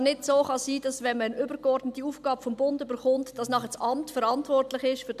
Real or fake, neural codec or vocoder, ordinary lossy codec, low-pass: real; none; none; 14.4 kHz